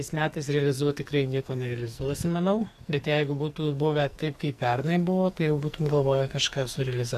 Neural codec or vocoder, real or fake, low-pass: codec, 44.1 kHz, 2.6 kbps, SNAC; fake; 14.4 kHz